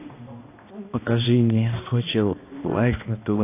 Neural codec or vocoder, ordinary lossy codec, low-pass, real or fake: codec, 16 kHz, 1 kbps, X-Codec, HuBERT features, trained on balanced general audio; AAC, 24 kbps; 3.6 kHz; fake